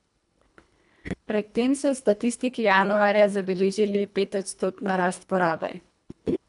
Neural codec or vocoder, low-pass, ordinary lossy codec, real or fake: codec, 24 kHz, 1.5 kbps, HILCodec; 10.8 kHz; none; fake